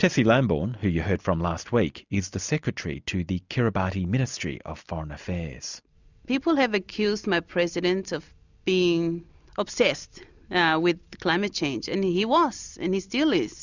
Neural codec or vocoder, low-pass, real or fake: none; 7.2 kHz; real